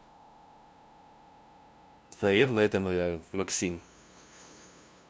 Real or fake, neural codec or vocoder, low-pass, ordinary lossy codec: fake; codec, 16 kHz, 0.5 kbps, FunCodec, trained on LibriTTS, 25 frames a second; none; none